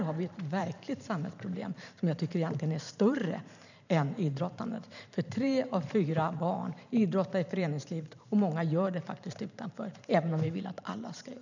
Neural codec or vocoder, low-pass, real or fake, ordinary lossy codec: vocoder, 44.1 kHz, 128 mel bands every 512 samples, BigVGAN v2; 7.2 kHz; fake; none